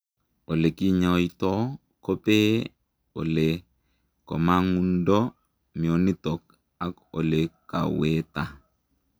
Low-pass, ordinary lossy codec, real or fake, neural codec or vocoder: none; none; real; none